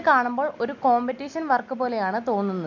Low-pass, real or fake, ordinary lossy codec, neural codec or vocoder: 7.2 kHz; real; none; none